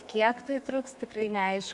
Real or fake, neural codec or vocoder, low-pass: fake; codec, 32 kHz, 1.9 kbps, SNAC; 10.8 kHz